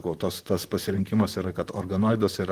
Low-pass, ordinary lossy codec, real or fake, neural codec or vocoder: 14.4 kHz; Opus, 24 kbps; fake; vocoder, 44.1 kHz, 128 mel bands, Pupu-Vocoder